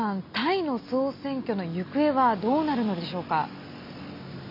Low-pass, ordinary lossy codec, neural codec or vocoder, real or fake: 5.4 kHz; none; none; real